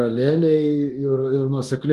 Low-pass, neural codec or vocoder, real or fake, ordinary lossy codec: 10.8 kHz; codec, 24 kHz, 0.9 kbps, DualCodec; fake; Opus, 24 kbps